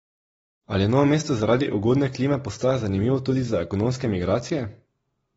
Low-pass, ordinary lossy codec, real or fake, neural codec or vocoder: 19.8 kHz; AAC, 24 kbps; real; none